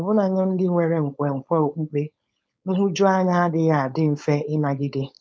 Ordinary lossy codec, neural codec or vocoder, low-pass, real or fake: none; codec, 16 kHz, 4.8 kbps, FACodec; none; fake